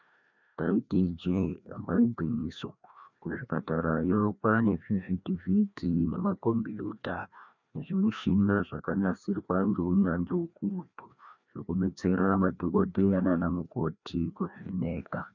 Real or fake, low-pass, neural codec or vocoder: fake; 7.2 kHz; codec, 16 kHz, 1 kbps, FreqCodec, larger model